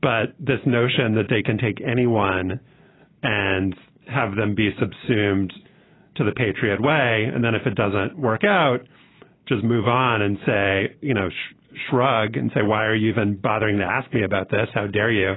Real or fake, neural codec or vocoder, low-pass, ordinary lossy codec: real; none; 7.2 kHz; AAC, 16 kbps